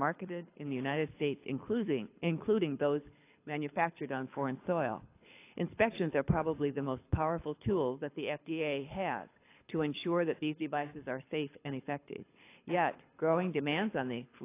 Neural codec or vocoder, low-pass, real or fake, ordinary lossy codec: codec, 24 kHz, 6 kbps, HILCodec; 3.6 kHz; fake; AAC, 24 kbps